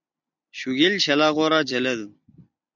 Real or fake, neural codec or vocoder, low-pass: fake; vocoder, 44.1 kHz, 128 mel bands every 256 samples, BigVGAN v2; 7.2 kHz